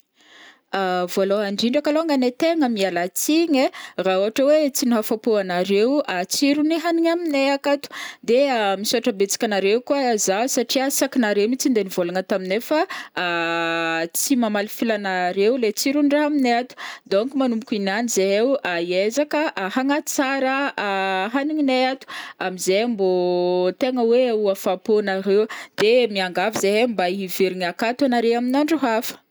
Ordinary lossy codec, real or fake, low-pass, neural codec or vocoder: none; real; none; none